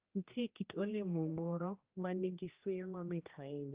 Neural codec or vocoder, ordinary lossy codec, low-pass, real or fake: codec, 16 kHz, 1 kbps, X-Codec, HuBERT features, trained on general audio; Opus, 24 kbps; 3.6 kHz; fake